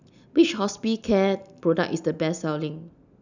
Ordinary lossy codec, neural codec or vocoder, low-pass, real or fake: none; none; 7.2 kHz; real